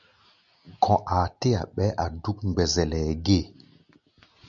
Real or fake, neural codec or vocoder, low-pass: real; none; 7.2 kHz